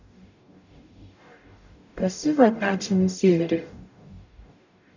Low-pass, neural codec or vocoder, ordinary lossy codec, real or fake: 7.2 kHz; codec, 44.1 kHz, 0.9 kbps, DAC; none; fake